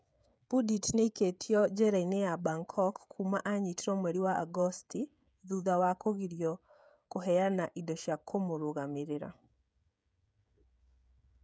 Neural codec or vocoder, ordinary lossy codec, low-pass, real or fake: codec, 16 kHz, 16 kbps, FreqCodec, smaller model; none; none; fake